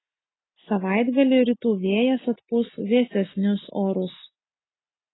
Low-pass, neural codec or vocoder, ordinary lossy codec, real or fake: 7.2 kHz; none; AAC, 16 kbps; real